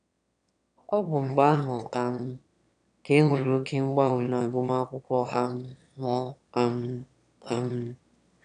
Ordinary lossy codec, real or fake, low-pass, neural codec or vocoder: none; fake; 9.9 kHz; autoencoder, 22.05 kHz, a latent of 192 numbers a frame, VITS, trained on one speaker